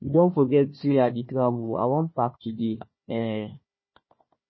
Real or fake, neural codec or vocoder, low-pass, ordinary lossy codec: fake; codec, 16 kHz, 1 kbps, FunCodec, trained on Chinese and English, 50 frames a second; 7.2 kHz; MP3, 24 kbps